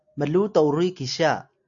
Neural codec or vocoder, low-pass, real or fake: none; 7.2 kHz; real